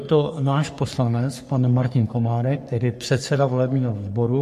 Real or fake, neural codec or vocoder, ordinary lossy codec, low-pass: fake; codec, 44.1 kHz, 3.4 kbps, Pupu-Codec; MP3, 64 kbps; 14.4 kHz